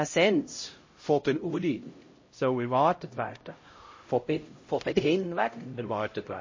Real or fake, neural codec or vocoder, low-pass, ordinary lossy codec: fake; codec, 16 kHz, 0.5 kbps, X-Codec, HuBERT features, trained on LibriSpeech; 7.2 kHz; MP3, 32 kbps